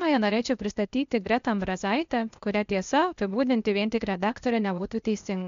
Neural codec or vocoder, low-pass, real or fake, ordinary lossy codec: codec, 16 kHz, 0.8 kbps, ZipCodec; 7.2 kHz; fake; MP3, 64 kbps